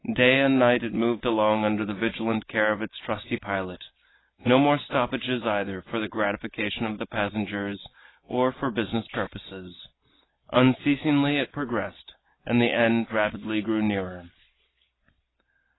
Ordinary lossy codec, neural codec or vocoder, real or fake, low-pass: AAC, 16 kbps; none; real; 7.2 kHz